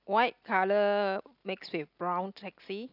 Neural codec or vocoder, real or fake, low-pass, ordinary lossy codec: none; real; 5.4 kHz; none